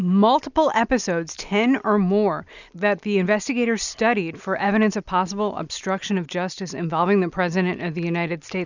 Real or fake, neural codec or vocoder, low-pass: real; none; 7.2 kHz